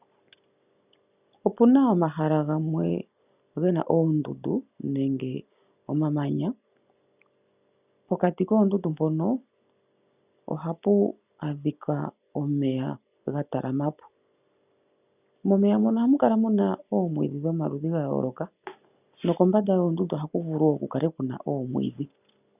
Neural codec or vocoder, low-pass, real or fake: none; 3.6 kHz; real